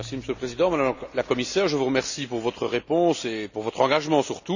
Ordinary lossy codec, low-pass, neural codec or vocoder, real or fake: none; 7.2 kHz; none; real